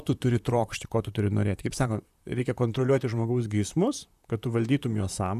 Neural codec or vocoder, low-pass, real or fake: vocoder, 44.1 kHz, 128 mel bands, Pupu-Vocoder; 14.4 kHz; fake